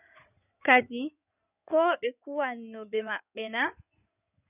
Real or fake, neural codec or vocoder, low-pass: fake; codec, 16 kHz in and 24 kHz out, 2.2 kbps, FireRedTTS-2 codec; 3.6 kHz